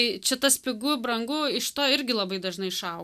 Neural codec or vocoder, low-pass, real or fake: none; 14.4 kHz; real